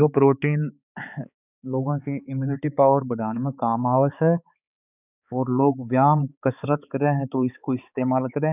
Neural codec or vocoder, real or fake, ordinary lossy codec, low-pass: codec, 16 kHz, 4 kbps, X-Codec, HuBERT features, trained on balanced general audio; fake; none; 3.6 kHz